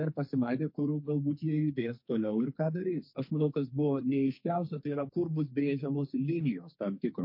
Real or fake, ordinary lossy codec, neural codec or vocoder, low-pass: fake; MP3, 32 kbps; codec, 44.1 kHz, 2.6 kbps, SNAC; 5.4 kHz